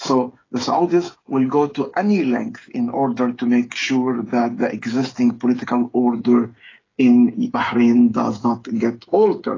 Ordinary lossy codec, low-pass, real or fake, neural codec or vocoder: AAC, 32 kbps; 7.2 kHz; fake; codec, 16 kHz, 8 kbps, FreqCodec, smaller model